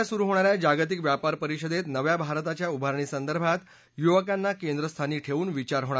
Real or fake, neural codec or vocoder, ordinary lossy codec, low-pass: real; none; none; none